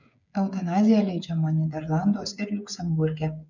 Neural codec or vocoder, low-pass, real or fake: codec, 16 kHz, 8 kbps, FreqCodec, smaller model; 7.2 kHz; fake